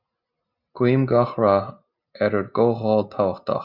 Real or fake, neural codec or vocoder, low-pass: real; none; 5.4 kHz